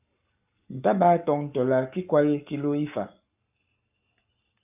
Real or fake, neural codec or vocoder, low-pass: fake; codec, 44.1 kHz, 7.8 kbps, Pupu-Codec; 3.6 kHz